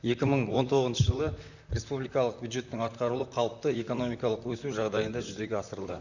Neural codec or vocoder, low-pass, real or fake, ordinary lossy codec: vocoder, 44.1 kHz, 128 mel bands, Pupu-Vocoder; 7.2 kHz; fake; none